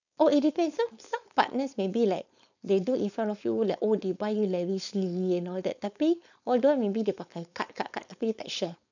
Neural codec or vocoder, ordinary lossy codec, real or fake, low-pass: codec, 16 kHz, 4.8 kbps, FACodec; none; fake; 7.2 kHz